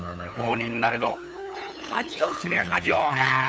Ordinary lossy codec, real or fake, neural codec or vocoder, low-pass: none; fake; codec, 16 kHz, 8 kbps, FunCodec, trained on LibriTTS, 25 frames a second; none